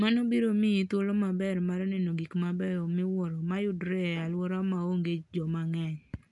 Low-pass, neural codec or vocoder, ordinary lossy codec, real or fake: 10.8 kHz; none; none; real